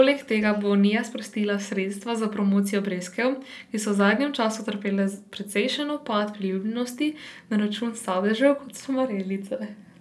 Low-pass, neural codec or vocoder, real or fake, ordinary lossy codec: none; none; real; none